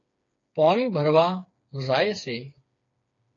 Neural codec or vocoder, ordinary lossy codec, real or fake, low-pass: codec, 16 kHz, 4 kbps, FreqCodec, smaller model; AAC, 48 kbps; fake; 7.2 kHz